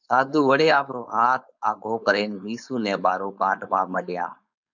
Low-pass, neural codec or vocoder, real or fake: 7.2 kHz; codec, 16 kHz, 4.8 kbps, FACodec; fake